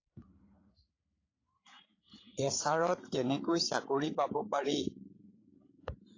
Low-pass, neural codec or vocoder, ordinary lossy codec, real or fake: 7.2 kHz; codec, 16 kHz, 8 kbps, FreqCodec, larger model; AAC, 32 kbps; fake